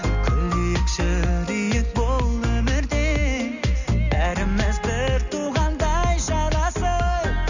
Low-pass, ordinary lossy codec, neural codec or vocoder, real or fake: 7.2 kHz; none; none; real